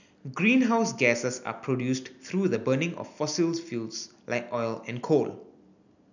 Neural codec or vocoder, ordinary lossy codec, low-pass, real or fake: none; none; 7.2 kHz; real